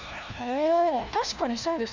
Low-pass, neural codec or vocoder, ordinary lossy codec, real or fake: 7.2 kHz; codec, 16 kHz, 1 kbps, FunCodec, trained on LibriTTS, 50 frames a second; none; fake